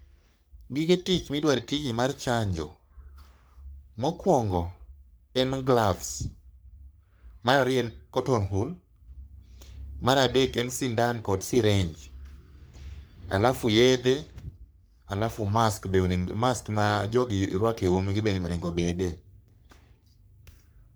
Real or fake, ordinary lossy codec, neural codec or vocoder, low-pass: fake; none; codec, 44.1 kHz, 3.4 kbps, Pupu-Codec; none